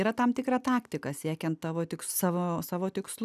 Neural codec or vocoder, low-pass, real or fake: none; 14.4 kHz; real